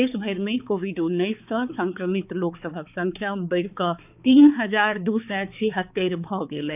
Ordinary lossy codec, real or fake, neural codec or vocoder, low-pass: none; fake; codec, 16 kHz, 4 kbps, X-Codec, HuBERT features, trained on balanced general audio; 3.6 kHz